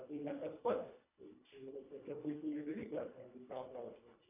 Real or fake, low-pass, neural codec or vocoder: fake; 3.6 kHz; codec, 24 kHz, 1.5 kbps, HILCodec